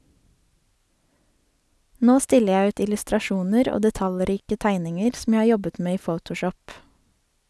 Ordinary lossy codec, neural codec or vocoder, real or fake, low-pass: none; none; real; none